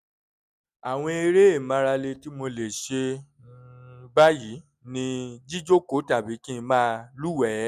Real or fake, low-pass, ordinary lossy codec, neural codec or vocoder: real; 14.4 kHz; none; none